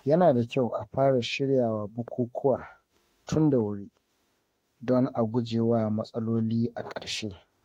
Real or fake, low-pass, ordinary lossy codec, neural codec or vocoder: fake; 19.8 kHz; AAC, 48 kbps; autoencoder, 48 kHz, 32 numbers a frame, DAC-VAE, trained on Japanese speech